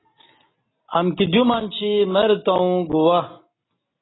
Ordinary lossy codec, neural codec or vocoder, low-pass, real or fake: AAC, 16 kbps; none; 7.2 kHz; real